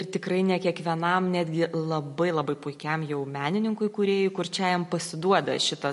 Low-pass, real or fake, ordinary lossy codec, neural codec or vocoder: 14.4 kHz; real; MP3, 48 kbps; none